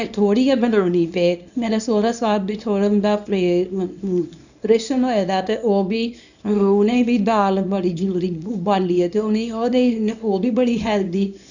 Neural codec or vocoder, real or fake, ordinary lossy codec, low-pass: codec, 24 kHz, 0.9 kbps, WavTokenizer, small release; fake; none; 7.2 kHz